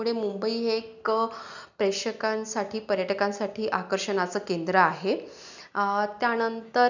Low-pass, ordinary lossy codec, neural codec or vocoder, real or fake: 7.2 kHz; none; none; real